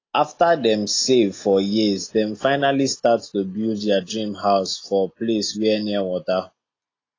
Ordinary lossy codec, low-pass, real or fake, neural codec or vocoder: AAC, 32 kbps; 7.2 kHz; real; none